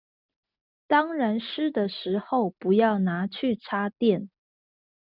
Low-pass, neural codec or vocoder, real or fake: 5.4 kHz; none; real